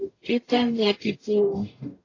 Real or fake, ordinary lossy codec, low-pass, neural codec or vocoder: fake; AAC, 32 kbps; 7.2 kHz; codec, 44.1 kHz, 0.9 kbps, DAC